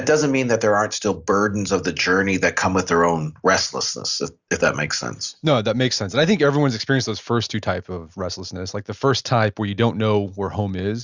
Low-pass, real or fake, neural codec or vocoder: 7.2 kHz; real; none